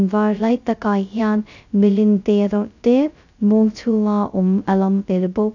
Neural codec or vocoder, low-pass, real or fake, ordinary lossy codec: codec, 16 kHz, 0.2 kbps, FocalCodec; 7.2 kHz; fake; none